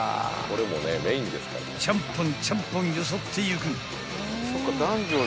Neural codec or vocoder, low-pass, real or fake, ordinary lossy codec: none; none; real; none